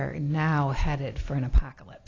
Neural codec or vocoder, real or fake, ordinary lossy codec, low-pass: none; real; AAC, 32 kbps; 7.2 kHz